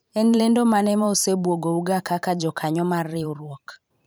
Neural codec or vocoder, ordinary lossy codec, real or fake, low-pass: vocoder, 44.1 kHz, 128 mel bands every 512 samples, BigVGAN v2; none; fake; none